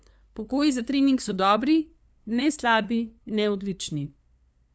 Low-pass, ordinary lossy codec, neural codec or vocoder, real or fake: none; none; codec, 16 kHz, 2 kbps, FunCodec, trained on LibriTTS, 25 frames a second; fake